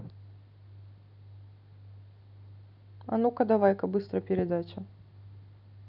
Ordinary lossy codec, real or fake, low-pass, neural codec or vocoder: none; real; 5.4 kHz; none